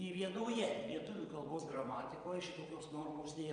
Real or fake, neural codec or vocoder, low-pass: fake; vocoder, 22.05 kHz, 80 mel bands, WaveNeXt; 9.9 kHz